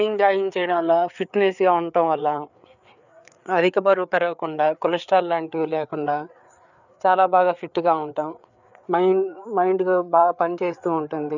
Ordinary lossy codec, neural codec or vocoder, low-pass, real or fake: none; codec, 16 kHz, 4 kbps, FreqCodec, larger model; 7.2 kHz; fake